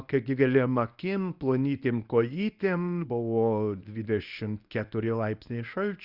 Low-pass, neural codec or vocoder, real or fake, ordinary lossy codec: 5.4 kHz; codec, 24 kHz, 0.9 kbps, WavTokenizer, medium speech release version 1; fake; Opus, 64 kbps